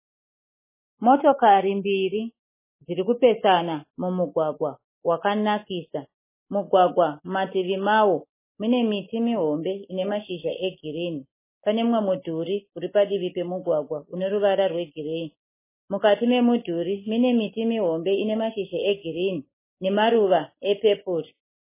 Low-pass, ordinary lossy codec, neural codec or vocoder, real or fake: 3.6 kHz; MP3, 16 kbps; none; real